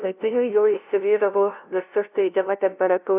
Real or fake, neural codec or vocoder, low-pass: fake; codec, 16 kHz, 0.5 kbps, FunCodec, trained on LibriTTS, 25 frames a second; 3.6 kHz